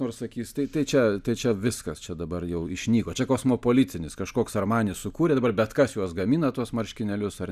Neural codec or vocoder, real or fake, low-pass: none; real; 14.4 kHz